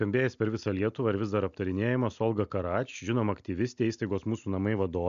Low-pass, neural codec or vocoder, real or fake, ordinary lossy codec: 7.2 kHz; none; real; MP3, 64 kbps